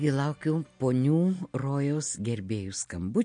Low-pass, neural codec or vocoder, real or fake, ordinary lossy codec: 9.9 kHz; none; real; MP3, 48 kbps